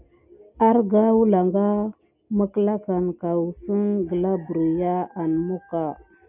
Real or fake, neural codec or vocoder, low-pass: real; none; 3.6 kHz